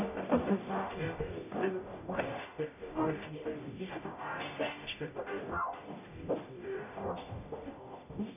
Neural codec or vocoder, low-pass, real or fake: codec, 44.1 kHz, 0.9 kbps, DAC; 3.6 kHz; fake